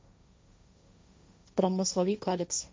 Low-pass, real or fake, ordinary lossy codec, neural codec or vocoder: none; fake; none; codec, 16 kHz, 1.1 kbps, Voila-Tokenizer